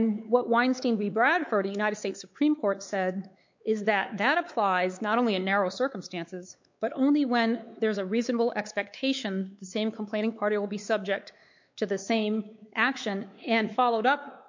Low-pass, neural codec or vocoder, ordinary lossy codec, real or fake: 7.2 kHz; codec, 16 kHz, 4 kbps, X-Codec, WavLM features, trained on Multilingual LibriSpeech; MP3, 48 kbps; fake